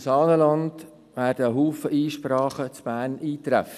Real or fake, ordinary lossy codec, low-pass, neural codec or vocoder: real; none; 14.4 kHz; none